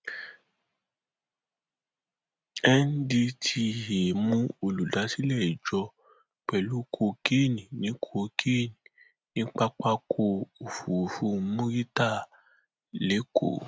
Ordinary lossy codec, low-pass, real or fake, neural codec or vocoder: none; none; real; none